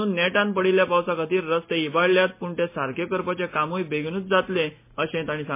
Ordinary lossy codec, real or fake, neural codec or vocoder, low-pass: MP3, 24 kbps; real; none; 3.6 kHz